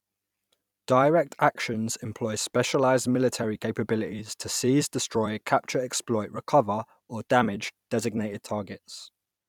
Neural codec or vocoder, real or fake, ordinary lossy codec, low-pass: vocoder, 44.1 kHz, 128 mel bands every 256 samples, BigVGAN v2; fake; none; 19.8 kHz